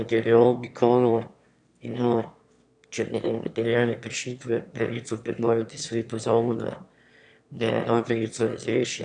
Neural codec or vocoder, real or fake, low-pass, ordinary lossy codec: autoencoder, 22.05 kHz, a latent of 192 numbers a frame, VITS, trained on one speaker; fake; 9.9 kHz; none